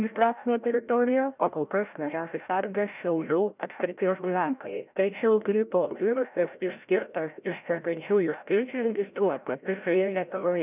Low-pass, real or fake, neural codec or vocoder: 3.6 kHz; fake; codec, 16 kHz, 0.5 kbps, FreqCodec, larger model